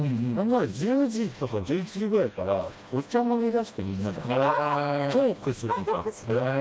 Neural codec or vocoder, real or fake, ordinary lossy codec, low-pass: codec, 16 kHz, 1 kbps, FreqCodec, smaller model; fake; none; none